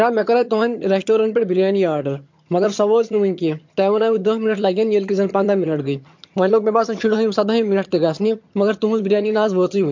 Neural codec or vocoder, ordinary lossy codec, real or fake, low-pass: vocoder, 22.05 kHz, 80 mel bands, HiFi-GAN; MP3, 48 kbps; fake; 7.2 kHz